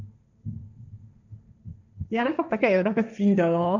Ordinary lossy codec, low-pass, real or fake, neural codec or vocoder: none; 7.2 kHz; fake; codec, 16 kHz, 1.1 kbps, Voila-Tokenizer